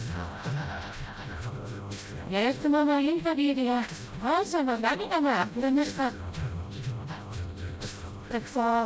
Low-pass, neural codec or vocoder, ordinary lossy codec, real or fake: none; codec, 16 kHz, 0.5 kbps, FreqCodec, smaller model; none; fake